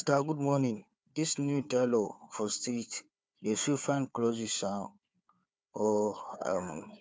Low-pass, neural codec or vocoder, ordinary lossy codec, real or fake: none; codec, 16 kHz, 4 kbps, FunCodec, trained on Chinese and English, 50 frames a second; none; fake